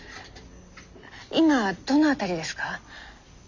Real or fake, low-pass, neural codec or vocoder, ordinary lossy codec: real; 7.2 kHz; none; Opus, 64 kbps